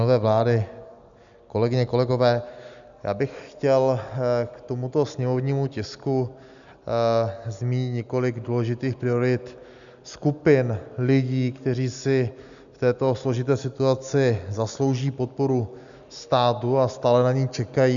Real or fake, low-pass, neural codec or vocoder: real; 7.2 kHz; none